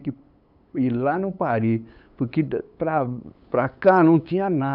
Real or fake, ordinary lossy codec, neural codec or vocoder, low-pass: fake; none; codec, 16 kHz, 8 kbps, FunCodec, trained on LibriTTS, 25 frames a second; 5.4 kHz